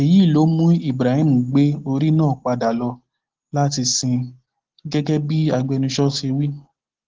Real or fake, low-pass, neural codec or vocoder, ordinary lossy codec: real; 7.2 kHz; none; Opus, 16 kbps